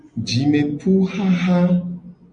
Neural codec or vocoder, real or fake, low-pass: none; real; 9.9 kHz